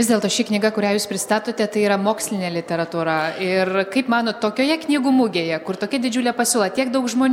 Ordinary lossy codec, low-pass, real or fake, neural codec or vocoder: MP3, 96 kbps; 19.8 kHz; real; none